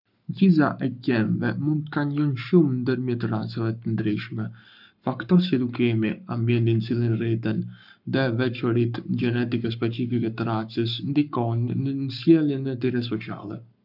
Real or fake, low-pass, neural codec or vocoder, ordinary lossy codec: fake; 5.4 kHz; codec, 44.1 kHz, 7.8 kbps, Pupu-Codec; none